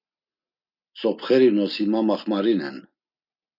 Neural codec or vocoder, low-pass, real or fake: none; 5.4 kHz; real